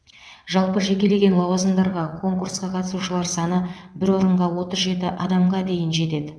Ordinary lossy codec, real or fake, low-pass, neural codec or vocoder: none; fake; none; vocoder, 22.05 kHz, 80 mel bands, WaveNeXt